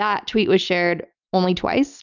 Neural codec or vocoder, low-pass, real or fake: none; 7.2 kHz; real